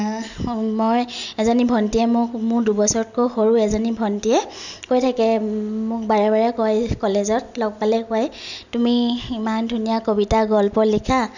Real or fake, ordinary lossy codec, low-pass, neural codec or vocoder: real; none; 7.2 kHz; none